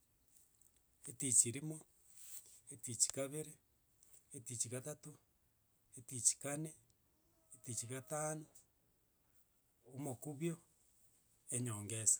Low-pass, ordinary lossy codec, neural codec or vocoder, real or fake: none; none; none; real